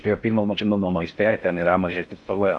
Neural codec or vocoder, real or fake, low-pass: codec, 16 kHz in and 24 kHz out, 0.6 kbps, FocalCodec, streaming, 4096 codes; fake; 10.8 kHz